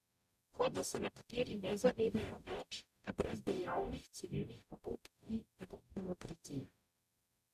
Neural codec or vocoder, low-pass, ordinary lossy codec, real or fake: codec, 44.1 kHz, 0.9 kbps, DAC; 14.4 kHz; none; fake